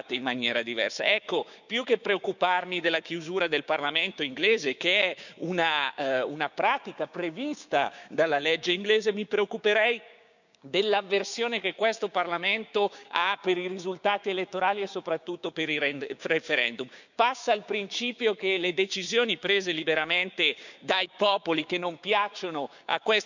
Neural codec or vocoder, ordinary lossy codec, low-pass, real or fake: codec, 16 kHz, 6 kbps, DAC; none; 7.2 kHz; fake